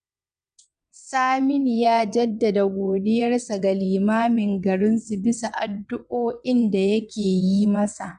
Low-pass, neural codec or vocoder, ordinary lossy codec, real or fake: 9.9 kHz; vocoder, 22.05 kHz, 80 mel bands, WaveNeXt; none; fake